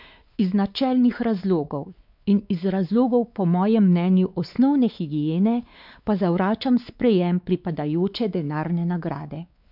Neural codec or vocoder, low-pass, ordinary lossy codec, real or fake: codec, 16 kHz, 4 kbps, X-Codec, WavLM features, trained on Multilingual LibriSpeech; 5.4 kHz; none; fake